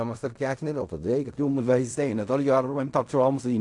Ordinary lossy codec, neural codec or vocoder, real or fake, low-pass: AAC, 64 kbps; codec, 16 kHz in and 24 kHz out, 0.4 kbps, LongCat-Audio-Codec, fine tuned four codebook decoder; fake; 10.8 kHz